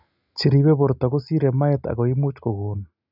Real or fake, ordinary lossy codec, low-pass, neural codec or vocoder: real; none; 5.4 kHz; none